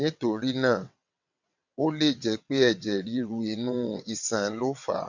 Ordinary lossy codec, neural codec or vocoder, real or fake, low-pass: none; vocoder, 22.05 kHz, 80 mel bands, WaveNeXt; fake; 7.2 kHz